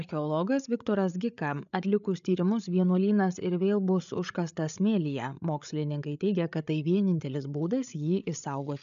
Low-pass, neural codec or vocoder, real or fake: 7.2 kHz; codec, 16 kHz, 8 kbps, FreqCodec, larger model; fake